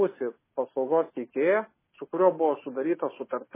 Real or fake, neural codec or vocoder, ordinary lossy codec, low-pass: real; none; MP3, 16 kbps; 3.6 kHz